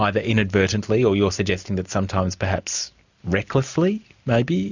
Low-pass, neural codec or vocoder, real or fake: 7.2 kHz; none; real